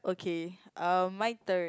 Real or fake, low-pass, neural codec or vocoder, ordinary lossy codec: real; none; none; none